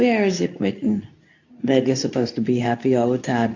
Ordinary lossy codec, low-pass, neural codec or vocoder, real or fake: AAC, 48 kbps; 7.2 kHz; codec, 24 kHz, 0.9 kbps, WavTokenizer, medium speech release version 2; fake